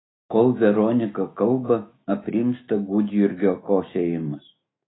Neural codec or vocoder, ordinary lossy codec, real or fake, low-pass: autoencoder, 48 kHz, 128 numbers a frame, DAC-VAE, trained on Japanese speech; AAC, 16 kbps; fake; 7.2 kHz